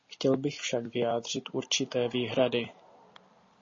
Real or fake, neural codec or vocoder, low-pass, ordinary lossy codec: real; none; 7.2 kHz; MP3, 32 kbps